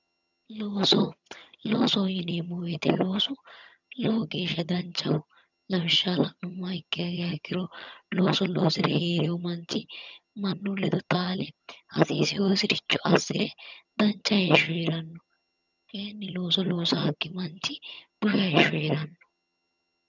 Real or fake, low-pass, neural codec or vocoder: fake; 7.2 kHz; vocoder, 22.05 kHz, 80 mel bands, HiFi-GAN